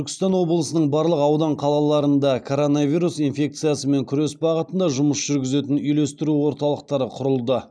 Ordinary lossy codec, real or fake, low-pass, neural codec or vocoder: none; real; none; none